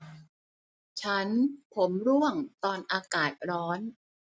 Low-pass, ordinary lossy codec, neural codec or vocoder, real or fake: none; none; none; real